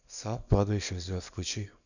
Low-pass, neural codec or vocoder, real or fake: 7.2 kHz; codec, 24 kHz, 0.9 kbps, WavTokenizer, small release; fake